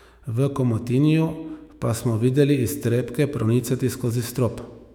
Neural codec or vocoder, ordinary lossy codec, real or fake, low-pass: autoencoder, 48 kHz, 128 numbers a frame, DAC-VAE, trained on Japanese speech; none; fake; 19.8 kHz